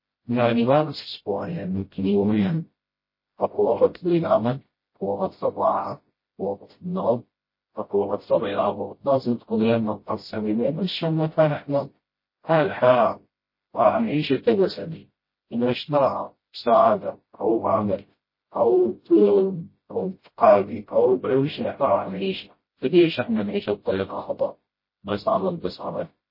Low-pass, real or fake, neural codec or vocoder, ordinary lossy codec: 5.4 kHz; fake; codec, 16 kHz, 0.5 kbps, FreqCodec, smaller model; MP3, 24 kbps